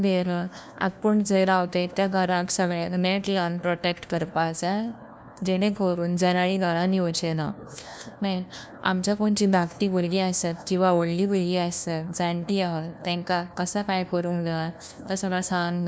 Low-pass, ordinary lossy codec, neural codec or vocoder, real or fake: none; none; codec, 16 kHz, 1 kbps, FunCodec, trained on LibriTTS, 50 frames a second; fake